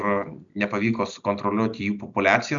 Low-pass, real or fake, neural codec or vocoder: 7.2 kHz; real; none